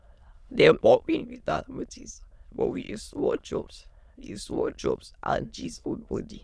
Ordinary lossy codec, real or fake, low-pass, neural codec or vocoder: none; fake; none; autoencoder, 22.05 kHz, a latent of 192 numbers a frame, VITS, trained on many speakers